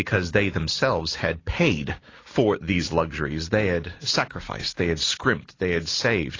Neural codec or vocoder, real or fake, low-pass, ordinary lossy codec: none; real; 7.2 kHz; AAC, 32 kbps